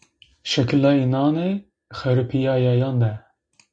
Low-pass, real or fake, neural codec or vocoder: 9.9 kHz; real; none